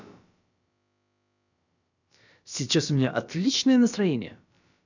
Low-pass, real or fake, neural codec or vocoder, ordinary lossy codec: 7.2 kHz; fake; codec, 16 kHz, about 1 kbps, DyCAST, with the encoder's durations; none